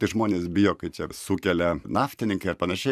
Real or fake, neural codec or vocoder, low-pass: fake; vocoder, 44.1 kHz, 128 mel bands every 512 samples, BigVGAN v2; 14.4 kHz